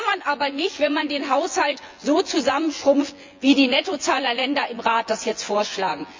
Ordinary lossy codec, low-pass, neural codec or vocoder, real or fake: MP3, 64 kbps; 7.2 kHz; vocoder, 24 kHz, 100 mel bands, Vocos; fake